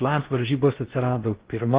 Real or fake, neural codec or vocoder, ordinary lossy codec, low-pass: fake; codec, 16 kHz in and 24 kHz out, 0.6 kbps, FocalCodec, streaming, 4096 codes; Opus, 16 kbps; 3.6 kHz